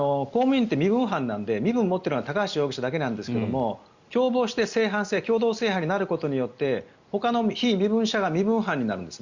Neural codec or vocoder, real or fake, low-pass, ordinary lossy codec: none; real; 7.2 kHz; Opus, 64 kbps